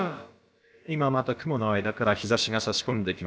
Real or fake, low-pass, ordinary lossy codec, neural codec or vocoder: fake; none; none; codec, 16 kHz, about 1 kbps, DyCAST, with the encoder's durations